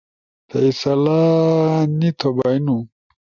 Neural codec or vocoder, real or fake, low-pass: none; real; 7.2 kHz